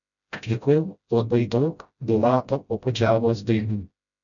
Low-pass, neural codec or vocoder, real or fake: 7.2 kHz; codec, 16 kHz, 0.5 kbps, FreqCodec, smaller model; fake